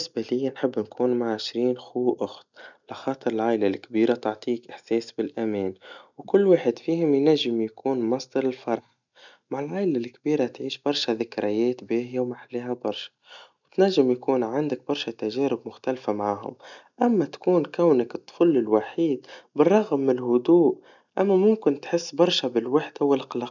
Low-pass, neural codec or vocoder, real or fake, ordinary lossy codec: 7.2 kHz; none; real; none